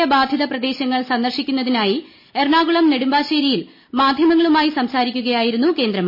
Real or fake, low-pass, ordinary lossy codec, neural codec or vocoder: real; 5.4 kHz; MP3, 24 kbps; none